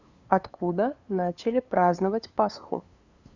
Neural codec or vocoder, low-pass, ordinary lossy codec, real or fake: codec, 16 kHz, 2 kbps, FunCodec, trained on LibriTTS, 25 frames a second; 7.2 kHz; Opus, 64 kbps; fake